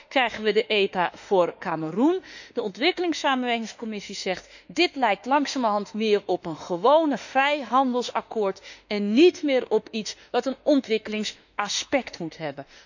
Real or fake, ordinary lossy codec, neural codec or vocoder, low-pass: fake; none; autoencoder, 48 kHz, 32 numbers a frame, DAC-VAE, trained on Japanese speech; 7.2 kHz